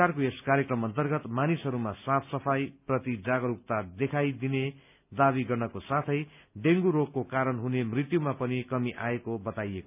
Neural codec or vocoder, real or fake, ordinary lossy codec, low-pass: none; real; none; 3.6 kHz